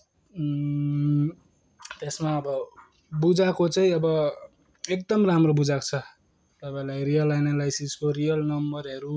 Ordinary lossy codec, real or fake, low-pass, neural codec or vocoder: none; real; none; none